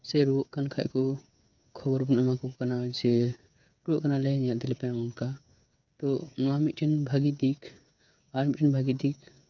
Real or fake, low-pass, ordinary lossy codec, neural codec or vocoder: fake; 7.2 kHz; none; codec, 16 kHz, 8 kbps, FreqCodec, smaller model